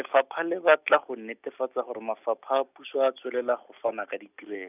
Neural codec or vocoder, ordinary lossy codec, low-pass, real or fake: none; none; 3.6 kHz; real